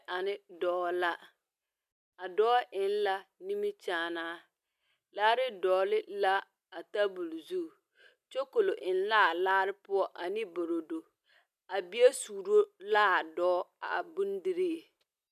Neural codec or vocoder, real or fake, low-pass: none; real; 14.4 kHz